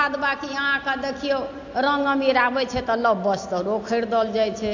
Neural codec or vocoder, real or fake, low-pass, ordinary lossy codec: none; real; 7.2 kHz; AAC, 48 kbps